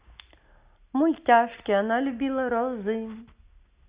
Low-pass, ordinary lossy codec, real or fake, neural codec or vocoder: 3.6 kHz; Opus, 64 kbps; real; none